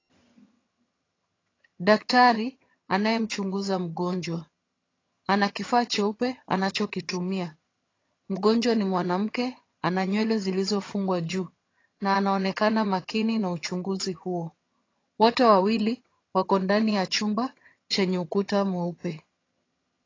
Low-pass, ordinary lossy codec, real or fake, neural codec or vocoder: 7.2 kHz; AAC, 32 kbps; fake; vocoder, 22.05 kHz, 80 mel bands, HiFi-GAN